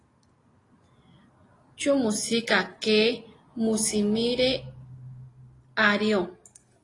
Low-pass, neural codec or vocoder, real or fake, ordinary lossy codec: 10.8 kHz; none; real; AAC, 32 kbps